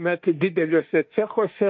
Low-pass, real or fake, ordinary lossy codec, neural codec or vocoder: 7.2 kHz; fake; MP3, 48 kbps; autoencoder, 48 kHz, 32 numbers a frame, DAC-VAE, trained on Japanese speech